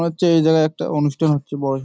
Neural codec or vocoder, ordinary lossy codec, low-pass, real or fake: none; none; none; real